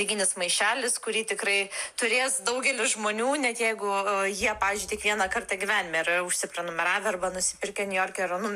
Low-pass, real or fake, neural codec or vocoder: 14.4 kHz; real; none